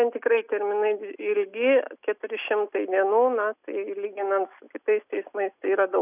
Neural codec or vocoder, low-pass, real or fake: none; 3.6 kHz; real